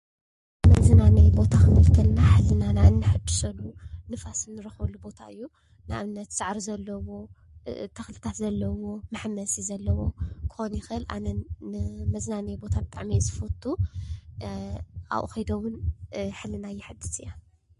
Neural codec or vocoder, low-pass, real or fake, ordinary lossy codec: codec, 44.1 kHz, 7.8 kbps, Pupu-Codec; 14.4 kHz; fake; MP3, 48 kbps